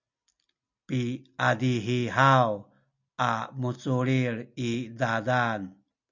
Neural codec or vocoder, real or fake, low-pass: none; real; 7.2 kHz